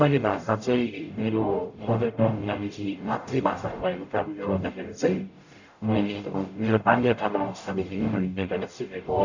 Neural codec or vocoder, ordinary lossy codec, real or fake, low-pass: codec, 44.1 kHz, 0.9 kbps, DAC; none; fake; 7.2 kHz